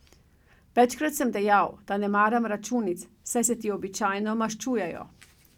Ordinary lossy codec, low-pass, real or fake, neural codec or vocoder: none; 19.8 kHz; real; none